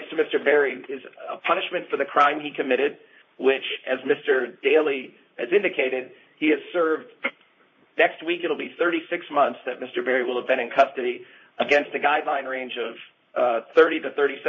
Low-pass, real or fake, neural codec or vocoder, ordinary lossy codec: 7.2 kHz; fake; vocoder, 44.1 kHz, 128 mel bands, Pupu-Vocoder; MP3, 32 kbps